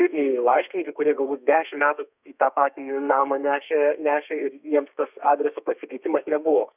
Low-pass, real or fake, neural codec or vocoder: 3.6 kHz; fake; codec, 32 kHz, 1.9 kbps, SNAC